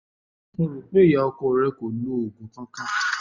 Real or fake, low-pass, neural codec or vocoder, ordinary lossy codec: real; 7.2 kHz; none; none